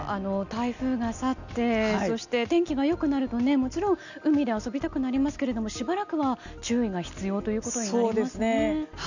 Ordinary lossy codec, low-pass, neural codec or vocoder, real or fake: none; 7.2 kHz; none; real